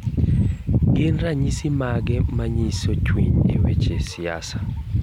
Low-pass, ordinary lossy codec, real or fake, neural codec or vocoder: 19.8 kHz; MP3, 96 kbps; real; none